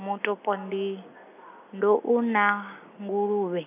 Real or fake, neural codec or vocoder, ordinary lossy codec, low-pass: real; none; none; 3.6 kHz